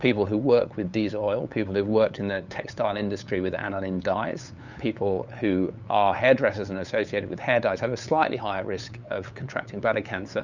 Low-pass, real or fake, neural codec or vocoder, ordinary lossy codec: 7.2 kHz; fake; codec, 16 kHz, 8 kbps, FunCodec, trained on LibriTTS, 25 frames a second; Opus, 64 kbps